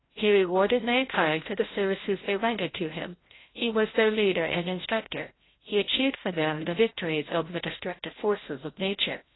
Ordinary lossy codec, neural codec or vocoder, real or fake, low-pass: AAC, 16 kbps; codec, 16 kHz, 0.5 kbps, FreqCodec, larger model; fake; 7.2 kHz